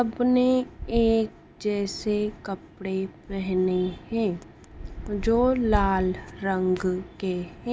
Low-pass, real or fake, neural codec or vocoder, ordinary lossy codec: none; real; none; none